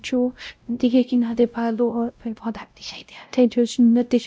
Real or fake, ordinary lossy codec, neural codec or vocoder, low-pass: fake; none; codec, 16 kHz, 0.5 kbps, X-Codec, WavLM features, trained on Multilingual LibriSpeech; none